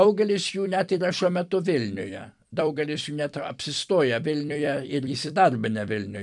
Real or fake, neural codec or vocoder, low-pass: fake; vocoder, 44.1 kHz, 128 mel bands every 256 samples, BigVGAN v2; 10.8 kHz